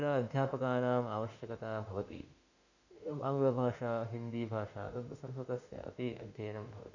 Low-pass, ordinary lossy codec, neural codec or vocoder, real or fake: 7.2 kHz; none; autoencoder, 48 kHz, 32 numbers a frame, DAC-VAE, trained on Japanese speech; fake